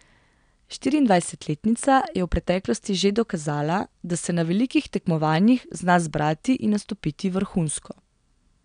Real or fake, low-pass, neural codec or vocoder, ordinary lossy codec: real; 9.9 kHz; none; none